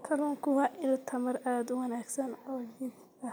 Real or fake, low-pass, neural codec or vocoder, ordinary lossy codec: real; none; none; none